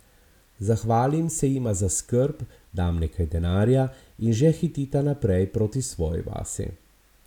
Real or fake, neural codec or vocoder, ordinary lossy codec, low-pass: real; none; none; 19.8 kHz